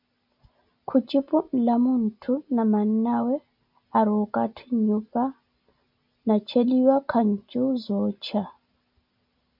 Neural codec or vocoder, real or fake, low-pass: none; real; 5.4 kHz